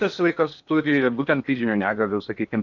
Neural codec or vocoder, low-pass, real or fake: codec, 16 kHz in and 24 kHz out, 0.8 kbps, FocalCodec, streaming, 65536 codes; 7.2 kHz; fake